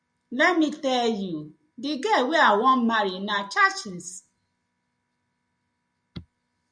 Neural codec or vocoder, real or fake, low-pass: none; real; 9.9 kHz